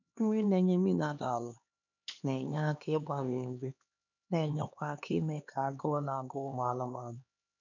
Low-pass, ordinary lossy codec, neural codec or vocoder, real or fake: 7.2 kHz; none; codec, 16 kHz, 2 kbps, X-Codec, HuBERT features, trained on LibriSpeech; fake